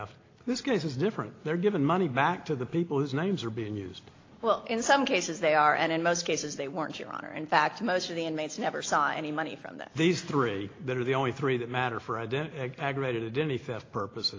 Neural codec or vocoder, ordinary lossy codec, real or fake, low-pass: none; AAC, 32 kbps; real; 7.2 kHz